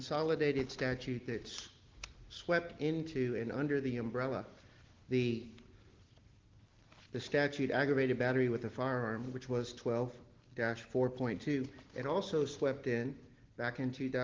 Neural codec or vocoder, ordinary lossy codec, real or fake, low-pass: none; Opus, 16 kbps; real; 7.2 kHz